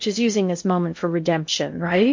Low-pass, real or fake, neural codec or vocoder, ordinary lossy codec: 7.2 kHz; fake; codec, 16 kHz in and 24 kHz out, 0.6 kbps, FocalCodec, streaming, 2048 codes; MP3, 48 kbps